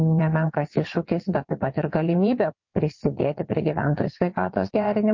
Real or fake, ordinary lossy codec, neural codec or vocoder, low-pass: fake; MP3, 48 kbps; vocoder, 22.05 kHz, 80 mel bands, WaveNeXt; 7.2 kHz